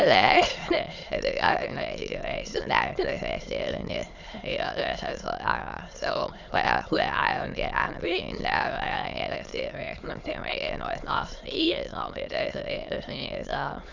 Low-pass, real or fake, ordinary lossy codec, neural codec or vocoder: 7.2 kHz; fake; none; autoencoder, 22.05 kHz, a latent of 192 numbers a frame, VITS, trained on many speakers